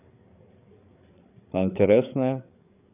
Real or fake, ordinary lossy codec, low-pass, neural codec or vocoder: fake; none; 3.6 kHz; codec, 16 kHz, 8 kbps, FreqCodec, larger model